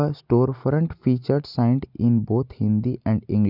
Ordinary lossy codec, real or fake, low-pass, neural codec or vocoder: none; real; 5.4 kHz; none